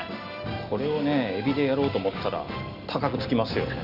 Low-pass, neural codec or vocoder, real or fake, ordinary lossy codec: 5.4 kHz; none; real; none